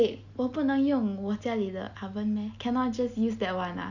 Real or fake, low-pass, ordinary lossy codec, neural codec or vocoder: real; 7.2 kHz; none; none